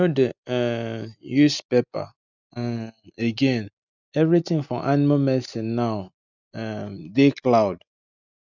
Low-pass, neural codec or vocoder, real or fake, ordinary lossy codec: 7.2 kHz; none; real; none